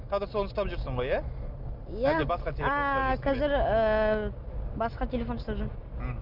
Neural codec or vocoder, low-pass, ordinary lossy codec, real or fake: none; 5.4 kHz; none; real